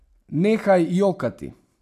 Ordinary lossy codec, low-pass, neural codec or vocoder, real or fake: none; 14.4 kHz; none; real